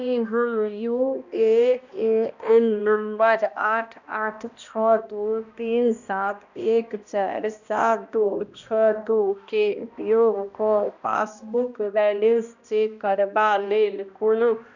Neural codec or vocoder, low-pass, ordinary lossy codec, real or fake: codec, 16 kHz, 1 kbps, X-Codec, HuBERT features, trained on balanced general audio; 7.2 kHz; none; fake